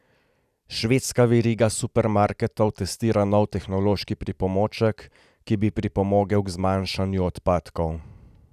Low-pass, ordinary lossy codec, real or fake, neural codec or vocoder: 14.4 kHz; none; real; none